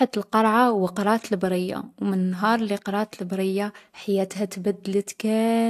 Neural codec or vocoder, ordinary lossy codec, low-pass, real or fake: none; AAC, 64 kbps; 14.4 kHz; real